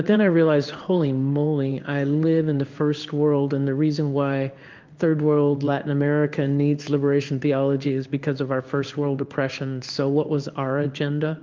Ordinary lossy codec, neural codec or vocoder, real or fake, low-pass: Opus, 24 kbps; codec, 16 kHz in and 24 kHz out, 1 kbps, XY-Tokenizer; fake; 7.2 kHz